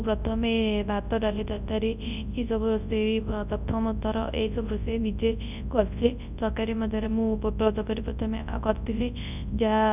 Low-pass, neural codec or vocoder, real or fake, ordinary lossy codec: 3.6 kHz; codec, 24 kHz, 0.9 kbps, WavTokenizer, large speech release; fake; none